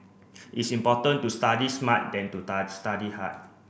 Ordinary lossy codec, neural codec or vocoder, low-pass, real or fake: none; none; none; real